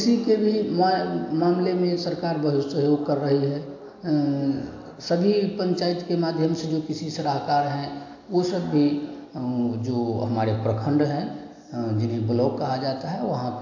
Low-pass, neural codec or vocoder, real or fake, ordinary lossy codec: 7.2 kHz; none; real; AAC, 48 kbps